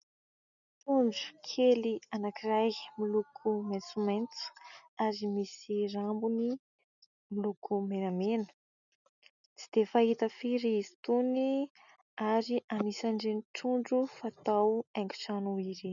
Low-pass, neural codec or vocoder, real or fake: 7.2 kHz; none; real